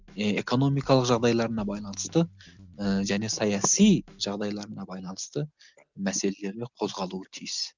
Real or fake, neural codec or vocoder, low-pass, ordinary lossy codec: real; none; 7.2 kHz; none